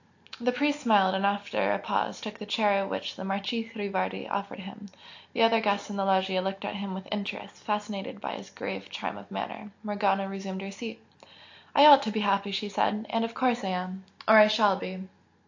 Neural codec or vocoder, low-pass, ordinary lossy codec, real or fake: none; 7.2 kHz; AAC, 48 kbps; real